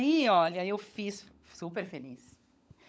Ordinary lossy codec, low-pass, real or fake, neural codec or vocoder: none; none; fake; codec, 16 kHz, 16 kbps, FunCodec, trained on LibriTTS, 50 frames a second